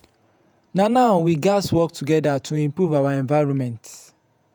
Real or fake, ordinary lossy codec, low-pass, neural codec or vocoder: fake; none; none; vocoder, 48 kHz, 128 mel bands, Vocos